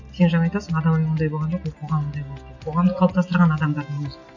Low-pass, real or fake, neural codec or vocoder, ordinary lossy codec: 7.2 kHz; real; none; none